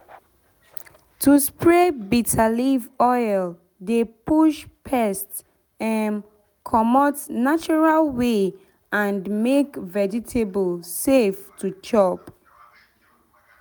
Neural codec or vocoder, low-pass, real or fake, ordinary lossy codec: none; none; real; none